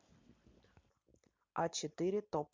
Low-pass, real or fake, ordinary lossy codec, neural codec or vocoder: 7.2 kHz; real; MP3, 64 kbps; none